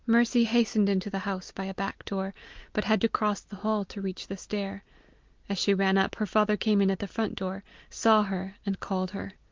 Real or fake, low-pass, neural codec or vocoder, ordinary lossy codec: real; 7.2 kHz; none; Opus, 24 kbps